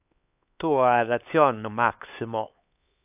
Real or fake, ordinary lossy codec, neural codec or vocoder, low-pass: fake; AAC, 32 kbps; codec, 16 kHz, 4 kbps, X-Codec, HuBERT features, trained on LibriSpeech; 3.6 kHz